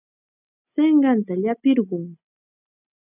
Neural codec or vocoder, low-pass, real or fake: none; 3.6 kHz; real